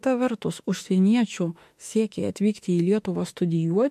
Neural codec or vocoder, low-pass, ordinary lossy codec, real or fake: autoencoder, 48 kHz, 32 numbers a frame, DAC-VAE, trained on Japanese speech; 14.4 kHz; MP3, 64 kbps; fake